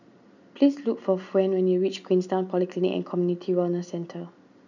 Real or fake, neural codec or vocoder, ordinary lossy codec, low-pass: real; none; none; 7.2 kHz